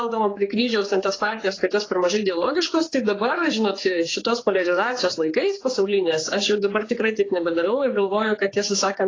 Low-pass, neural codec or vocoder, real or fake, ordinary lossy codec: 7.2 kHz; codec, 16 kHz, 4 kbps, X-Codec, HuBERT features, trained on general audio; fake; AAC, 32 kbps